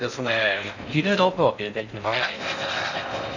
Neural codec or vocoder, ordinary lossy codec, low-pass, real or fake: codec, 16 kHz in and 24 kHz out, 0.6 kbps, FocalCodec, streaming, 4096 codes; none; 7.2 kHz; fake